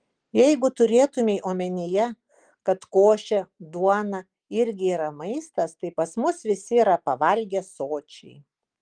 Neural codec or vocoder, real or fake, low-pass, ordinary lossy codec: none; real; 9.9 kHz; Opus, 24 kbps